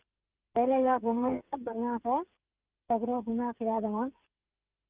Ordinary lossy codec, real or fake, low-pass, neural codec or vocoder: Opus, 24 kbps; fake; 3.6 kHz; codec, 16 kHz, 16 kbps, FreqCodec, smaller model